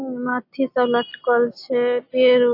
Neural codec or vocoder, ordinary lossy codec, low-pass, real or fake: none; AAC, 32 kbps; 5.4 kHz; real